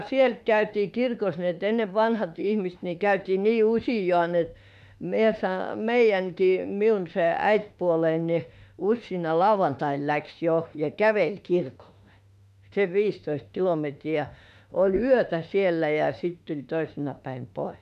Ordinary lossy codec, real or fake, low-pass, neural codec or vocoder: none; fake; 14.4 kHz; autoencoder, 48 kHz, 32 numbers a frame, DAC-VAE, trained on Japanese speech